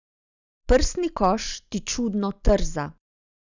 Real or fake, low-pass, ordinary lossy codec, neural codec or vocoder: real; 7.2 kHz; none; none